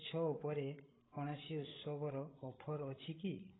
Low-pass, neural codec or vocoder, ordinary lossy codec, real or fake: 7.2 kHz; codec, 16 kHz, 16 kbps, FreqCodec, larger model; AAC, 16 kbps; fake